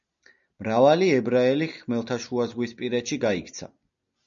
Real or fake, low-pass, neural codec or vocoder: real; 7.2 kHz; none